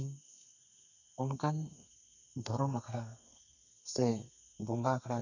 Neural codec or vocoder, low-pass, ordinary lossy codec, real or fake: codec, 32 kHz, 1.9 kbps, SNAC; 7.2 kHz; none; fake